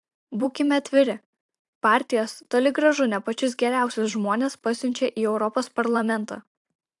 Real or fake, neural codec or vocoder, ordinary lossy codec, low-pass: fake; vocoder, 44.1 kHz, 128 mel bands every 512 samples, BigVGAN v2; AAC, 64 kbps; 10.8 kHz